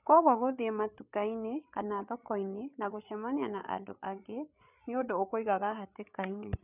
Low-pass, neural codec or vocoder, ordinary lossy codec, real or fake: 3.6 kHz; codec, 16 kHz, 8 kbps, FreqCodec, larger model; none; fake